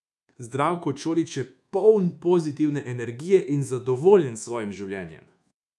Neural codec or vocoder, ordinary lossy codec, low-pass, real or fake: codec, 24 kHz, 1.2 kbps, DualCodec; none; none; fake